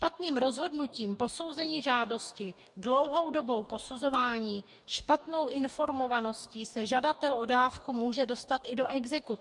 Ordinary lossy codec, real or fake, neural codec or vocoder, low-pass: MP3, 64 kbps; fake; codec, 44.1 kHz, 2.6 kbps, DAC; 10.8 kHz